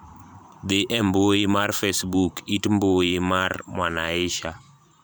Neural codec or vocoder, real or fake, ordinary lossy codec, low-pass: vocoder, 44.1 kHz, 128 mel bands every 512 samples, BigVGAN v2; fake; none; none